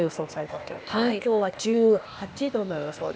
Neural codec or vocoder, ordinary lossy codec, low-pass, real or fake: codec, 16 kHz, 0.8 kbps, ZipCodec; none; none; fake